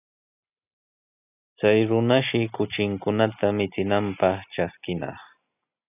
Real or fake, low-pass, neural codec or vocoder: real; 3.6 kHz; none